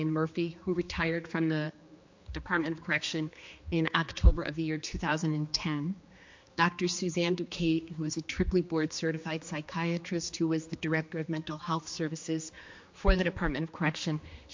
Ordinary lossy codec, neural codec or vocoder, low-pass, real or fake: MP3, 48 kbps; codec, 16 kHz, 2 kbps, X-Codec, HuBERT features, trained on general audio; 7.2 kHz; fake